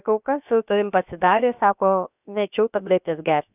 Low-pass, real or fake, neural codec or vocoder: 3.6 kHz; fake; codec, 16 kHz, 0.7 kbps, FocalCodec